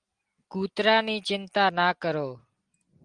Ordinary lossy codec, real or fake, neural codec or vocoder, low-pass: Opus, 24 kbps; real; none; 9.9 kHz